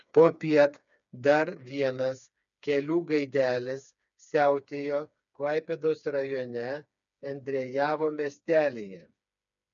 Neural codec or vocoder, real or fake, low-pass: codec, 16 kHz, 4 kbps, FreqCodec, smaller model; fake; 7.2 kHz